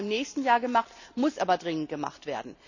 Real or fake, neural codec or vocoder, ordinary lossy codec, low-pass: real; none; none; 7.2 kHz